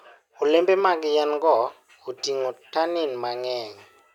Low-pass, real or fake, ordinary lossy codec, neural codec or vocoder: 19.8 kHz; real; none; none